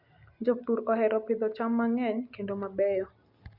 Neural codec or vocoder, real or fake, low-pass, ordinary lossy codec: none; real; 5.4 kHz; Opus, 64 kbps